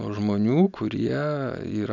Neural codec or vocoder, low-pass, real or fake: none; 7.2 kHz; real